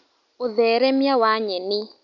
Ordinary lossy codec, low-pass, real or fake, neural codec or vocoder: none; 7.2 kHz; real; none